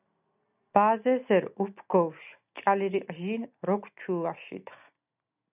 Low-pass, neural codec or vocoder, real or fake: 3.6 kHz; none; real